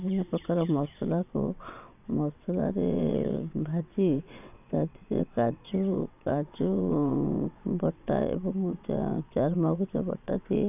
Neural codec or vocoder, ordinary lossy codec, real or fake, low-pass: vocoder, 22.05 kHz, 80 mel bands, WaveNeXt; none; fake; 3.6 kHz